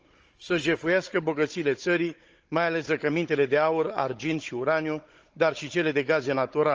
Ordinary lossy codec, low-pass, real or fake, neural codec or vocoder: Opus, 24 kbps; 7.2 kHz; fake; codec, 16 kHz, 16 kbps, FunCodec, trained on Chinese and English, 50 frames a second